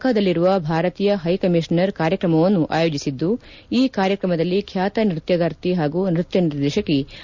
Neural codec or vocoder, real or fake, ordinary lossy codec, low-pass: none; real; Opus, 64 kbps; 7.2 kHz